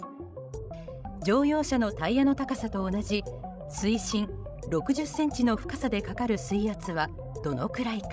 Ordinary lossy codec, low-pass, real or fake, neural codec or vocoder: none; none; fake; codec, 16 kHz, 16 kbps, FreqCodec, larger model